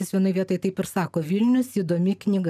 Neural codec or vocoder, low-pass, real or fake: none; 14.4 kHz; real